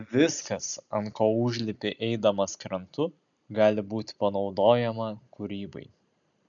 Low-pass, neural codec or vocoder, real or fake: 7.2 kHz; none; real